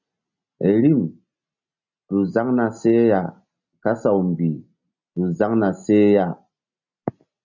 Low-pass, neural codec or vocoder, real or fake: 7.2 kHz; none; real